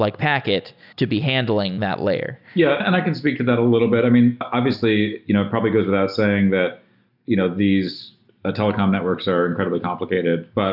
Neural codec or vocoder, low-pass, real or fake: none; 5.4 kHz; real